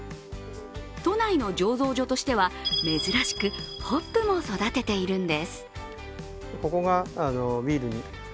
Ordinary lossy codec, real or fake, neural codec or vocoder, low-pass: none; real; none; none